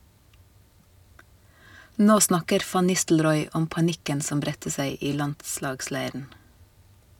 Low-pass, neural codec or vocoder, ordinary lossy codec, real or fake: 19.8 kHz; none; none; real